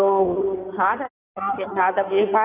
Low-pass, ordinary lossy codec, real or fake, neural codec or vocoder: 3.6 kHz; none; fake; codec, 16 kHz in and 24 kHz out, 2.2 kbps, FireRedTTS-2 codec